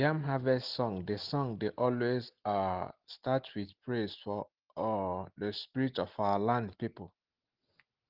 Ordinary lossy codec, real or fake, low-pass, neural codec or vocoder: Opus, 16 kbps; real; 5.4 kHz; none